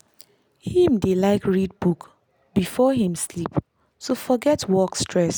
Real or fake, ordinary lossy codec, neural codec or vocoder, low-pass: real; none; none; none